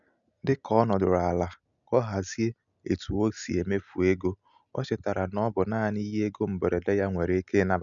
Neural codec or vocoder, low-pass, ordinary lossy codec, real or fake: none; 7.2 kHz; none; real